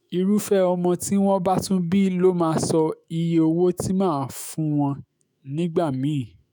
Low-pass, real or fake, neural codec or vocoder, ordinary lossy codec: none; fake; autoencoder, 48 kHz, 128 numbers a frame, DAC-VAE, trained on Japanese speech; none